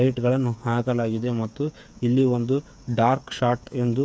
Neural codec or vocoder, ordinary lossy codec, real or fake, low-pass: codec, 16 kHz, 8 kbps, FreqCodec, smaller model; none; fake; none